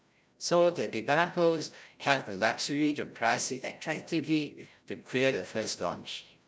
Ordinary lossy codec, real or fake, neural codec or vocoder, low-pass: none; fake; codec, 16 kHz, 0.5 kbps, FreqCodec, larger model; none